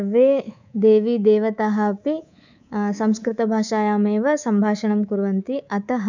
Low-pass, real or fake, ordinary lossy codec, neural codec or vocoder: 7.2 kHz; fake; none; codec, 24 kHz, 3.1 kbps, DualCodec